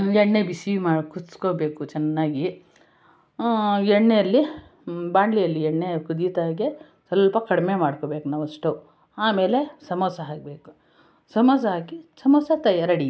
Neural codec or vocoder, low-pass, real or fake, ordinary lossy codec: none; none; real; none